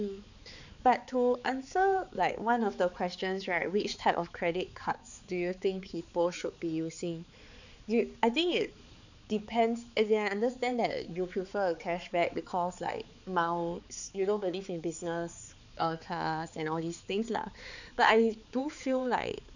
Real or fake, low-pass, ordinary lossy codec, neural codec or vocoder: fake; 7.2 kHz; none; codec, 16 kHz, 4 kbps, X-Codec, HuBERT features, trained on balanced general audio